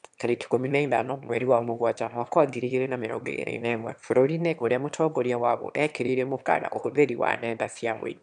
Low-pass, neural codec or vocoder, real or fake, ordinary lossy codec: 9.9 kHz; autoencoder, 22.05 kHz, a latent of 192 numbers a frame, VITS, trained on one speaker; fake; none